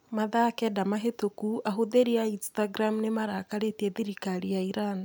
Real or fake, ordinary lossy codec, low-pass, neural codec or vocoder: real; none; none; none